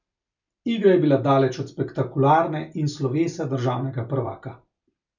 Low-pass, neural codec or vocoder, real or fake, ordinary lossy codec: 7.2 kHz; none; real; none